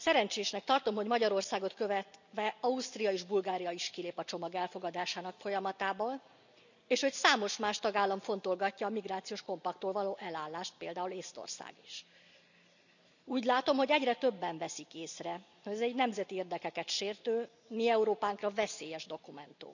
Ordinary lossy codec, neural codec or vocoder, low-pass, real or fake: none; none; 7.2 kHz; real